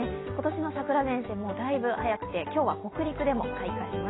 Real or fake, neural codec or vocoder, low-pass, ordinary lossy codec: real; none; 7.2 kHz; AAC, 16 kbps